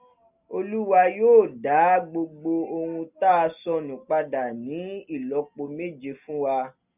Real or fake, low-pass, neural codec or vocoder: real; 3.6 kHz; none